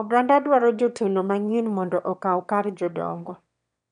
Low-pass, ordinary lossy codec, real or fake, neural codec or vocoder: 9.9 kHz; none; fake; autoencoder, 22.05 kHz, a latent of 192 numbers a frame, VITS, trained on one speaker